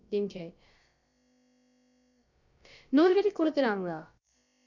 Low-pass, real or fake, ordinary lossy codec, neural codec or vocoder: 7.2 kHz; fake; none; codec, 16 kHz, about 1 kbps, DyCAST, with the encoder's durations